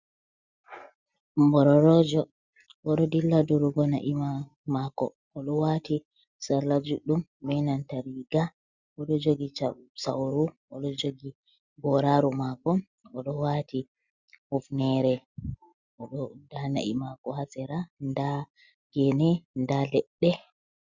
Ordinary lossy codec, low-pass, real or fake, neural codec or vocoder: Opus, 64 kbps; 7.2 kHz; real; none